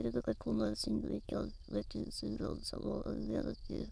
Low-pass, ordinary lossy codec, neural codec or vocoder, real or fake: none; none; autoencoder, 22.05 kHz, a latent of 192 numbers a frame, VITS, trained on many speakers; fake